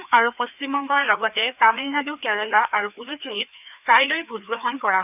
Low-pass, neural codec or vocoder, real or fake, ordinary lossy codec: 3.6 kHz; codec, 16 kHz, 2 kbps, FreqCodec, larger model; fake; none